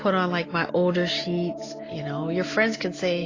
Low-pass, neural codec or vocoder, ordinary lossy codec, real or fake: 7.2 kHz; none; AAC, 32 kbps; real